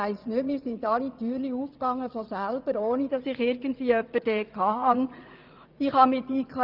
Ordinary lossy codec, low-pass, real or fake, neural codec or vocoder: Opus, 24 kbps; 5.4 kHz; fake; vocoder, 44.1 kHz, 80 mel bands, Vocos